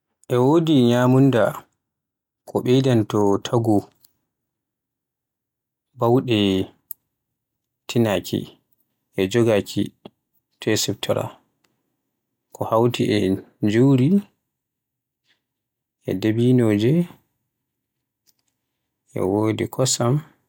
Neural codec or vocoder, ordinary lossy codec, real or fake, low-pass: none; none; real; 19.8 kHz